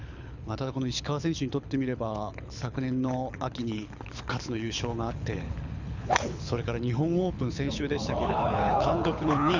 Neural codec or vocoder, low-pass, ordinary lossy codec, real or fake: codec, 24 kHz, 6 kbps, HILCodec; 7.2 kHz; none; fake